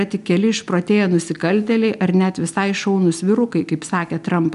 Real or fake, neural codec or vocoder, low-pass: real; none; 10.8 kHz